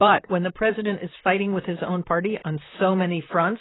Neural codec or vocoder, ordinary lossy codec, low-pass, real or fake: vocoder, 44.1 kHz, 128 mel bands, Pupu-Vocoder; AAC, 16 kbps; 7.2 kHz; fake